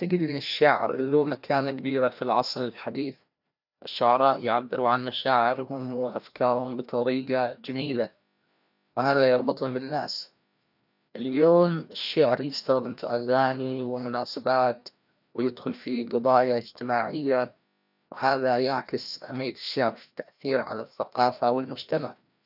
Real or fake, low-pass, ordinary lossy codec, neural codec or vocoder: fake; 5.4 kHz; none; codec, 16 kHz, 1 kbps, FreqCodec, larger model